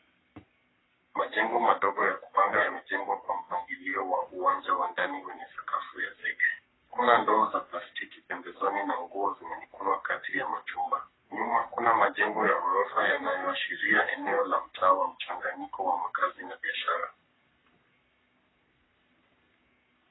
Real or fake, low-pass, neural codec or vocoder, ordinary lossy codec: fake; 7.2 kHz; codec, 44.1 kHz, 3.4 kbps, Pupu-Codec; AAC, 16 kbps